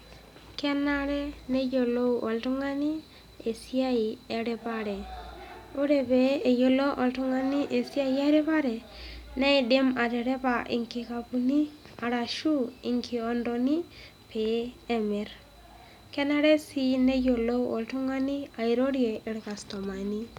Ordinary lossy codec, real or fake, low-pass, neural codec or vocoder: none; real; 19.8 kHz; none